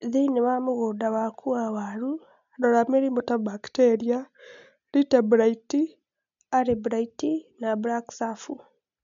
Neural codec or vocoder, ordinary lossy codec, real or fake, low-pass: none; MP3, 64 kbps; real; 7.2 kHz